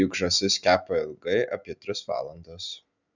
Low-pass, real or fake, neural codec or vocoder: 7.2 kHz; real; none